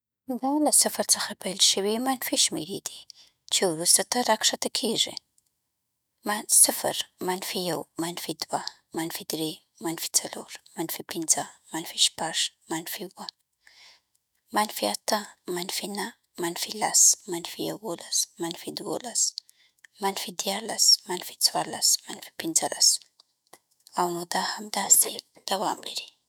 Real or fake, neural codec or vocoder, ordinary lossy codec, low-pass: fake; autoencoder, 48 kHz, 128 numbers a frame, DAC-VAE, trained on Japanese speech; none; none